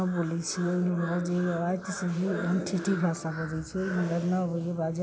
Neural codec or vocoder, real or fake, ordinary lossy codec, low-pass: none; real; none; none